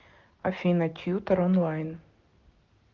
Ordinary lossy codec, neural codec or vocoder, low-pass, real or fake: Opus, 32 kbps; none; 7.2 kHz; real